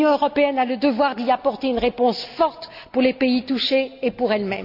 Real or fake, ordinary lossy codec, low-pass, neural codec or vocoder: real; none; 5.4 kHz; none